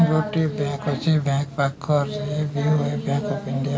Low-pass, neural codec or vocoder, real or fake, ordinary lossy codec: none; none; real; none